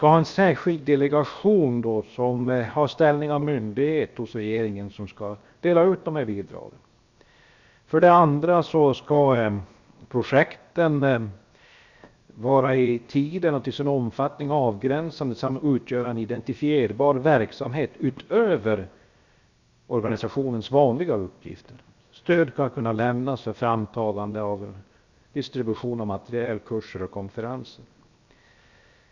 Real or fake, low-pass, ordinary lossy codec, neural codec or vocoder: fake; 7.2 kHz; Opus, 64 kbps; codec, 16 kHz, 0.7 kbps, FocalCodec